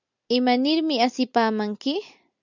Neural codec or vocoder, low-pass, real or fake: none; 7.2 kHz; real